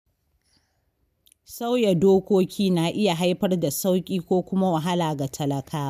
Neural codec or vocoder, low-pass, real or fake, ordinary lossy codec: none; 14.4 kHz; real; none